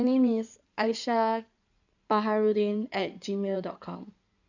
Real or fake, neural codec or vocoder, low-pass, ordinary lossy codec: fake; codec, 16 kHz in and 24 kHz out, 2.2 kbps, FireRedTTS-2 codec; 7.2 kHz; none